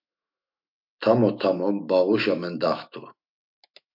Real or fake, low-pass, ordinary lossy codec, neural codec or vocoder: fake; 5.4 kHz; MP3, 48 kbps; autoencoder, 48 kHz, 128 numbers a frame, DAC-VAE, trained on Japanese speech